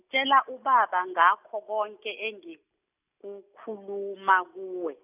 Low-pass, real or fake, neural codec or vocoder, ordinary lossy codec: 3.6 kHz; real; none; none